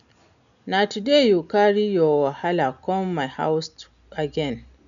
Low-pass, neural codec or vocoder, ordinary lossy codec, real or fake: 7.2 kHz; none; none; real